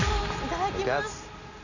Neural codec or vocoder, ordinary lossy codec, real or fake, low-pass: none; none; real; 7.2 kHz